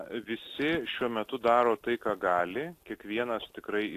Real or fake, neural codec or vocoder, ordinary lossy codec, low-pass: real; none; AAC, 64 kbps; 14.4 kHz